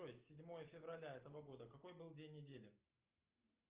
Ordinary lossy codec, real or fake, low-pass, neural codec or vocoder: Opus, 32 kbps; real; 3.6 kHz; none